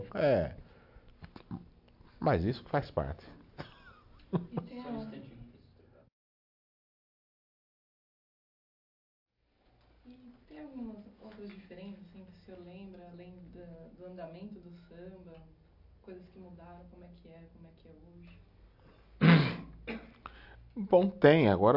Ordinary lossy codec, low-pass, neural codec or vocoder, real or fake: AAC, 48 kbps; 5.4 kHz; none; real